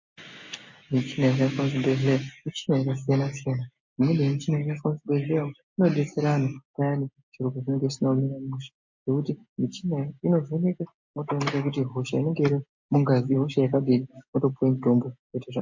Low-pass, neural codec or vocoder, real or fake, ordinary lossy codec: 7.2 kHz; none; real; MP3, 48 kbps